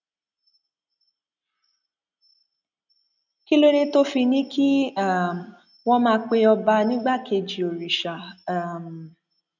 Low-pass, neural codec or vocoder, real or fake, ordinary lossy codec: 7.2 kHz; none; real; none